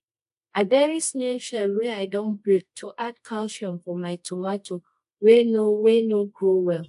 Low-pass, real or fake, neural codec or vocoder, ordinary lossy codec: 10.8 kHz; fake; codec, 24 kHz, 0.9 kbps, WavTokenizer, medium music audio release; AAC, 64 kbps